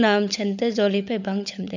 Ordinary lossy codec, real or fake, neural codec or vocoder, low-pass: none; fake; codec, 16 kHz, 16 kbps, FunCodec, trained on LibriTTS, 50 frames a second; 7.2 kHz